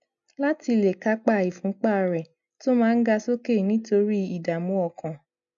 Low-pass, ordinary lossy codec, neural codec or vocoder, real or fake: 7.2 kHz; MP3, 96 kbps; none; real